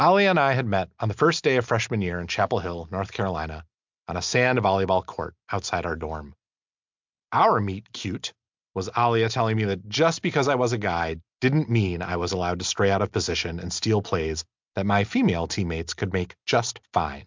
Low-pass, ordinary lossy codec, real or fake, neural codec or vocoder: 7.2 kHz; MP3, 64 kbps; real; none